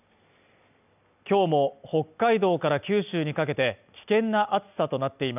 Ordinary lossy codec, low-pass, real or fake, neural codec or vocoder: none; 3.6 kHz; real; none